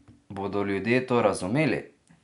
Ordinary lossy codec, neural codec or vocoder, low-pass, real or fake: none; none; 10.8 kHz; real